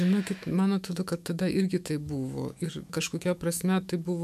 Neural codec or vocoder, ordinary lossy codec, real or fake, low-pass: autoencoder, 48 kHz, 128 numbers a frame, DAC-VAE, trained on Japanese speech; MP3, 96 kbps; fake; 14.4 kHz